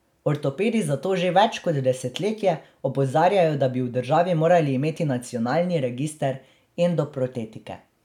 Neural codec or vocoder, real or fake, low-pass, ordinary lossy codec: none; real; 19.8 kHz; none